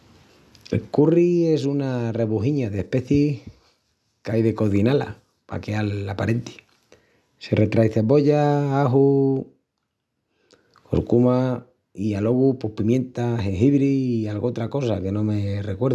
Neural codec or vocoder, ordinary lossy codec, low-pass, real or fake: none; none; none; real